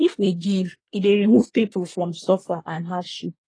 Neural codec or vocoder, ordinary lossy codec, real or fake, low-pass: codec, 24 kHz, 1 kbps, SNAC; AAC, 32 kbps; fake; 9.9 kHz